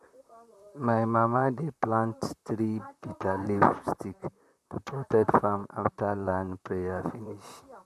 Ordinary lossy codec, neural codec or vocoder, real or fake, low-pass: MP3, 96 kbps; vocoder, 44.1 kHz, 128 mel bands, Pupu-Vocoder; fake; 14.4 kHz